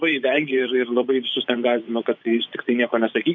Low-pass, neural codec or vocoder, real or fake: 7.2 kHz; vocoder, 24 kHz, 100 mel bands, Vocos; fake